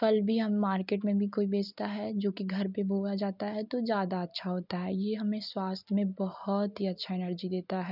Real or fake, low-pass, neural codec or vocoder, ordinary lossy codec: real; 5.4 kHz; none; none